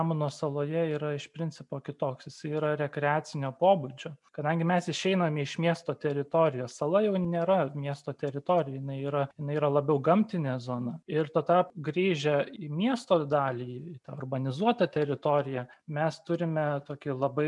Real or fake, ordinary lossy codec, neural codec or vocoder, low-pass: real; MP3, 96 kbps; none; 10.8 kHz